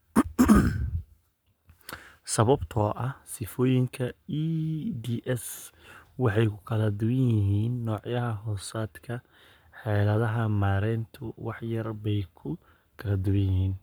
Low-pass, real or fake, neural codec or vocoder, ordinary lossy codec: none; fake; codec, 44.1 kHz, 7.8 kbps, Pupu-Codec; none